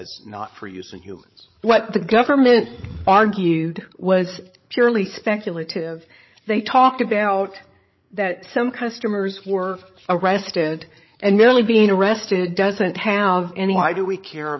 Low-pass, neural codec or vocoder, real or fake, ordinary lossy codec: 7.2 kHz; codec, 16 kHz, 8 kbps, FreqCodec, larger model; fake; MP3, 24 kbps